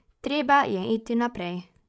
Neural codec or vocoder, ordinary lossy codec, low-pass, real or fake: codec, 16 kHz, 16 kbps, FreqCodec, larger model; none; none; fake